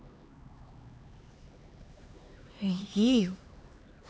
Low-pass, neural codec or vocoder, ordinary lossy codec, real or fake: none; codec, 16 kHz, 4 kbps, X-Codec, HuBERT features, trained on LibriSpeech; none; fake